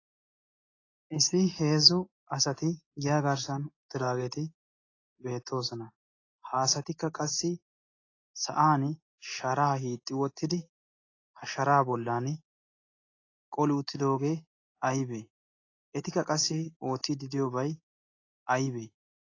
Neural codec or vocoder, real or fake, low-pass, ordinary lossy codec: none; real; 7.2 kHz; AAC, 32 kbps